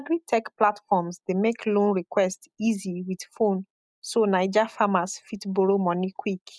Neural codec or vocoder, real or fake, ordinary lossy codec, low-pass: none; real; none; none